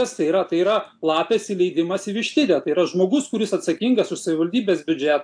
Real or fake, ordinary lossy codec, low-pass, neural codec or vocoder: real; AAC, 64 kbps; 9.9 kHz; none